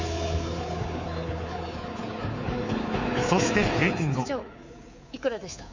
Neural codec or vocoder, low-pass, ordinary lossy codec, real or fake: codec, 24 kHz, 3.1 kbps, DualCodec; 7.2 kHz; Opus, 64 kbps; fake